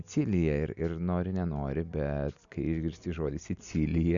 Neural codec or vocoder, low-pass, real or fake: none; 7.2 kHz; real